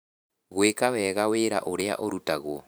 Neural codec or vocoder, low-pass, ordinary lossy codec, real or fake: vocoder, 44.1 kHz, 128 mel bands every 512 samples, BigVGAN v2; none; none; fake